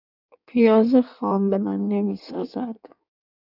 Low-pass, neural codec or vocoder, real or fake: 5.4 kHz; codec, 16 kHz in and 24 kHz out, 1.1 kbps, FireRedTTS-2 codec; fake